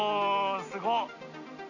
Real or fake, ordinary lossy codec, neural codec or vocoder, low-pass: real; none; none; 7.2 kHz